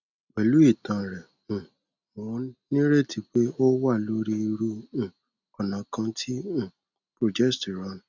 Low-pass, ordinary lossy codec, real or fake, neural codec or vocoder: 7.2 kHz; none; real; none